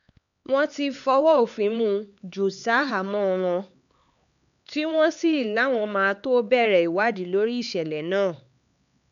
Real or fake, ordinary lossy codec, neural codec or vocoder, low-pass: fake; none; codec, 16 kHz, 4 kbps, X-Codec, HuBERT features, trained on LibriSpeech; 7.2 kHz